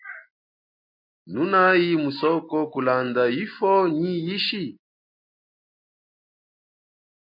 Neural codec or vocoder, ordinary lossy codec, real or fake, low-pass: none; MP3, 32 kbps; real; 5.4 kHz